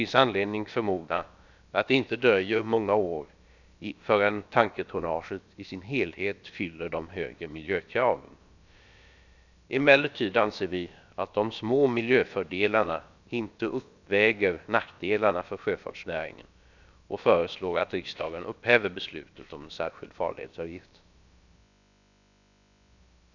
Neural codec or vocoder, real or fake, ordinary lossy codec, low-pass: codec, 16 kHz, about 1 kbps, DyCAST, with the encoder's durations; fake; none; 7.2 kHz